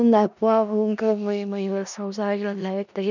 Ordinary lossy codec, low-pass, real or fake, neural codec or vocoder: none; 7.2 kHz; fake; codec, 16 kHz in and 24 kHz out, 0.4 kbps, LongCat-Audio-Codec, four codebook decoder